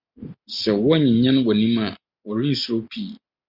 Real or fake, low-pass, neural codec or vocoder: real; 5.4 kHz; none